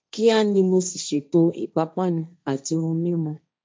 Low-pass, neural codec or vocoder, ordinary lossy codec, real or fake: none; codec, 16 kHz, 1.1 kbps, Voila-Tokenizer; none; fake